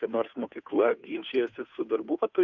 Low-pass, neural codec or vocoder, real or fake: 7.2 kHz; codec, 16 kHz, 2 kbps, FunCodec, trained on Chinese and English, 25 frames a second; fake